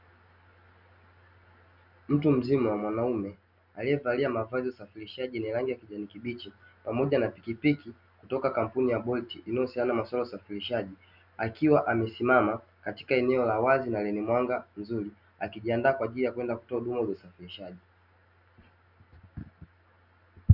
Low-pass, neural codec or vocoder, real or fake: 5.4 kHz; none; real